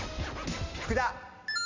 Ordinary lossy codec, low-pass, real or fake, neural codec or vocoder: MP3, 48 kbps; 7.2 kHz; real; none